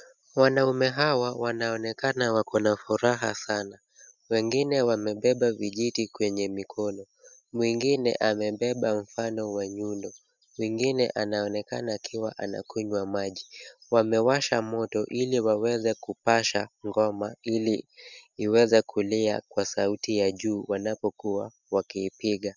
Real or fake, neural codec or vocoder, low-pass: real; none; 7.2 kHz